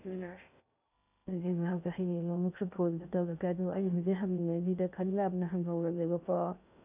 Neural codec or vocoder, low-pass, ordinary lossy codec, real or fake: codec, 16 kHz in and 24 kHz out, 0.6 kbps, FocalCodec, streaming, 2048 codes; 3.6 kHz; none; fake